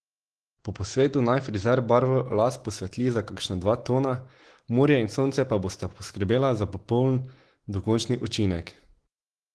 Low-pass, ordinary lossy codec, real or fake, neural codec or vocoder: 10.8 kHz; Opus, 16 kbps; fake; autoencoder, 48 kHz, 128 numbers a frame, DAC-VAE, trained on Japanese speech